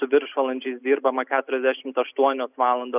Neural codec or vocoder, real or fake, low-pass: none; real; 3.6 kHz